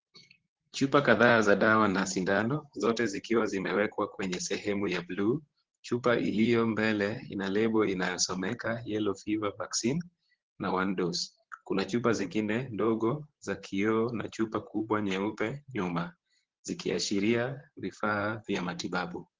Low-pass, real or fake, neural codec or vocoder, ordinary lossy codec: 7.2 kHz; fake; vocoder, 44.1 kHz, 128 mel bands, Pupu-Vocoder; Opus, 16 kbps